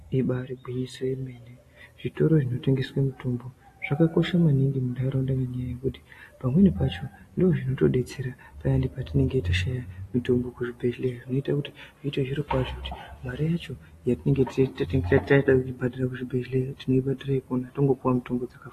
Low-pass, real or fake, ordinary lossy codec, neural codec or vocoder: 14.4 kHz; real; AAC, 48 kbps; none